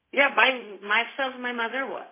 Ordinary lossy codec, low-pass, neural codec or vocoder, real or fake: MP3, 16 kbps; 3.6 kHz; codec, 16 kHz, 0.4 kbps, LongCat-Audio-Codec; fake